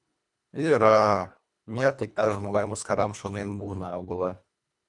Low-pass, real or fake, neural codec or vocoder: 10.8 kHz; fake; codec, 24 kHz, 1.5 kbps, HILCodec